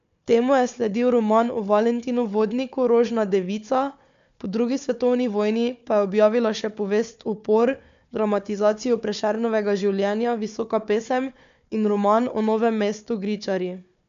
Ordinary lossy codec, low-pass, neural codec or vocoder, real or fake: MP3, 64 kbps; 7.2 kHz; codec, 16 kHz, 4 kbps, FunCodec, trained on Chinese and English, 50 frames a second; fake